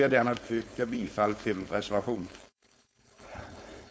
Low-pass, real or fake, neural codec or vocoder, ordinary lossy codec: none; fake; codec, 16 kHz, 4.8 kbps, FACodec; none